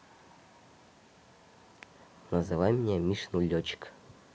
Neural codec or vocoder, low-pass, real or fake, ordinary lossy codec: none; none; real; none